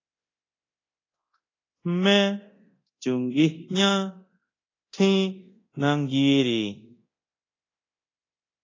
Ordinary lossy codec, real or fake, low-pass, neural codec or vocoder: AAC, 32 kbps; fake; 7.2 kHz; codec, 24 kHz, 0.9 kbps, DualCodec